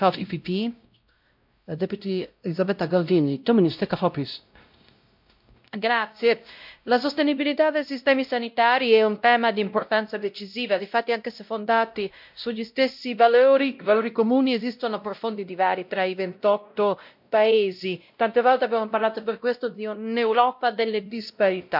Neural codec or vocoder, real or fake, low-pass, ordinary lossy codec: codec, 16 kHz, 0.5 kbps, X-Codec, WavLM features, trained on Multilingual LibriSpeech; fake; 5.4 kHz; MP3, 48 kbps